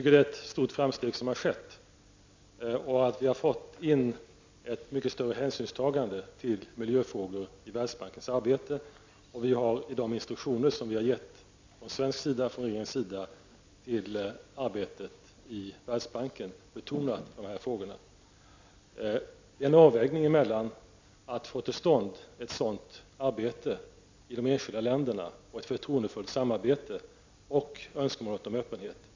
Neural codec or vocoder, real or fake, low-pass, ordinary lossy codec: none; real; 7.2 kHz; MP3, 64 kbps